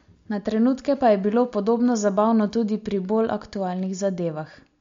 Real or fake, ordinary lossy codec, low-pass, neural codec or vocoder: real; MP3, 48 kbps; 7.2 kHz; none